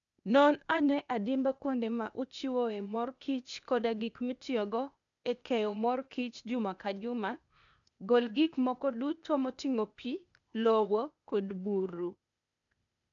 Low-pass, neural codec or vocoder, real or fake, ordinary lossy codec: 7.2 kHz; codec, 16 kHz, 0.8 kbps, ZipCodec; fake; none